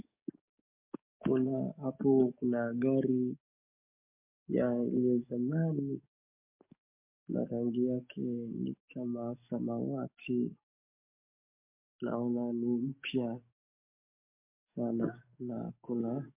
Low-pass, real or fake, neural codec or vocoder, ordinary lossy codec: 3.6 kHz; fake; codec, 44.1 kHz, 7.8 kbps, DAC; MP3, 32 kbps